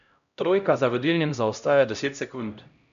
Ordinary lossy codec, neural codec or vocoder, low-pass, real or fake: MP3, 96 kbps; codec, 16 kHz, 0.5 kbps, X-Codec, HuBERT features, trained on LibriSpeech; 7.2 kHz; fake